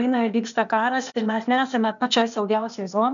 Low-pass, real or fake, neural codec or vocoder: 7.2 kHz; fake; codec, 16 kHz, 0.8 kbps, ZipCodec